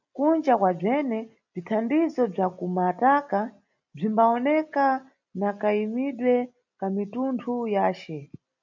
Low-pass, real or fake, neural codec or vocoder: 7.2 kHz; real; none